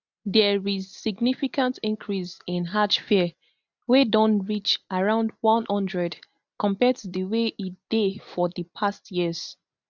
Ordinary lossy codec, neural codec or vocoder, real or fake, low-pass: none; none; real; 7.2 kHz